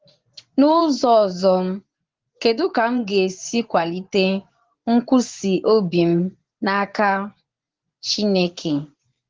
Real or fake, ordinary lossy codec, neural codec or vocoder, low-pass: fake; Opus, 16 kbps; vocoder, 24 kHz, 100 mel bands, Vocos; 7.2 kHz